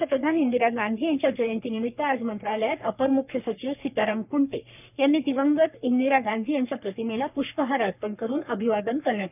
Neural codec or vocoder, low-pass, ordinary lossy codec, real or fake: codec, 44.1 kHz, 3.4 kbps, Pupu-Codec; 3.6 kHz; none; fake